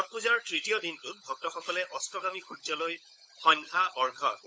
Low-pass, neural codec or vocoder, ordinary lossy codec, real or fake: none; codec, 16 kHz, 16 kbps, FunCodec, trained on LibriTTS, 50 frames a second; none; fake